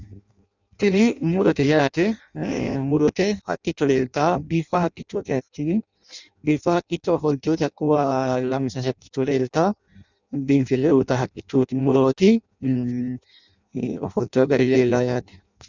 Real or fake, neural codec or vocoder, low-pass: fake; codec, 16 kHz in and 24 kHz out, 0.6 kbps, FireRedTTS-2 codec; 7.2 kHz